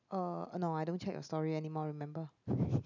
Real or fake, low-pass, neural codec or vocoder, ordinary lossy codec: real; 7.2 kHz; none; none